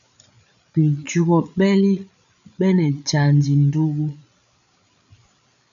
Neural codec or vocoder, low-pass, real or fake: codec, 16 kHz, 8 kbps, FreqCodec, larger model; 7.2 kHz; fake